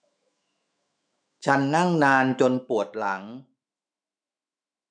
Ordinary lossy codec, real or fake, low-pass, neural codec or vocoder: none; fake; 9.9 kHz; autoencoder, 48 kHz, 128 numbers a frame, DAC-VAE, trained on Japanese speech